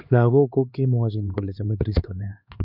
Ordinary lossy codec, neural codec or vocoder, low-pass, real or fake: none; codec, 16 kHz, 2 kbps, X-Codec, HuBERT features, trained on LibriSpeech; 5.4 kHz; fake